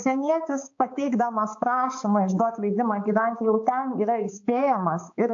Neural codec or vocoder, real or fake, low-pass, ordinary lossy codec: codec, 16 kHz, 4 kbps, X-Codec, HuBERT features, trained on balanced general audio; fake; 7.2 kHz; AAC, 48 kbps